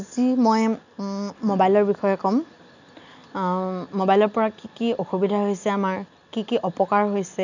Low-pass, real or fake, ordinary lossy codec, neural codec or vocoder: 7.2 kHz; real; none; none